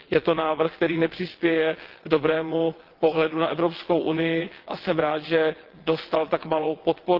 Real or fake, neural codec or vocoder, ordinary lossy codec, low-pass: fake; vocoder, 22.05 kHz, 80 mel bands, WaveNeXt; Opus, 16 kbps; 5.4 kHz